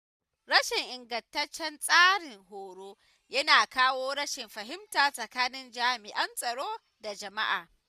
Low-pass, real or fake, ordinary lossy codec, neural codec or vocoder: 14.4 kHz; real; none; none